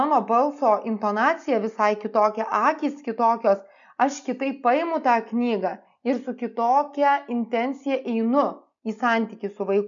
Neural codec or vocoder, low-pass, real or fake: none; 7.2 kHz; real